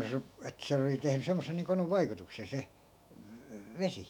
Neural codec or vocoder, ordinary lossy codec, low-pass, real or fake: autoencoder, 48 kHz, 128 numbers a frame, DAC-VAE, trained on Japanese speech; none; 19.8 kHz; fake